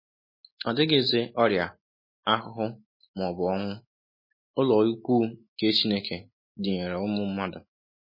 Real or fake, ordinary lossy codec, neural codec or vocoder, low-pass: real; MP3, 24 kbps; none; 5.4 kHz